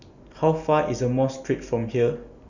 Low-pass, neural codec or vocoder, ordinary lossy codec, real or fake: 7.2 kHz; none; none; real